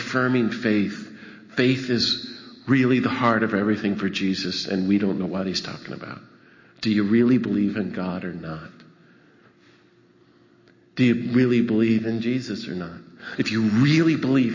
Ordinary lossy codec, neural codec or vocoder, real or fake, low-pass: MP3, 32 kbps; none; real; 7.2 kHz